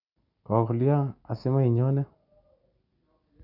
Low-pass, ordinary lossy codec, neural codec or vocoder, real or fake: 5.4 kHz; none; none; real